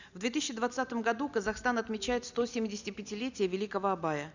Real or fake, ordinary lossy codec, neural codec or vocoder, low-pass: real; none; none; 7.2 kHz